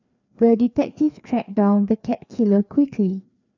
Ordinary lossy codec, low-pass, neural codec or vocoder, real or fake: none; 7.2 kHz; codec, 16 kHz, 2 kbps, FreqCodec, larger model; fake